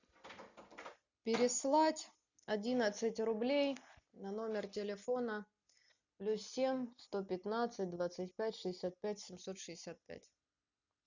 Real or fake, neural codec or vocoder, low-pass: real; none; 7.2 kHz